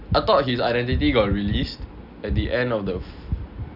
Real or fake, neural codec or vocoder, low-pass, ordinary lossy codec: real; none; 5.4 kHz; none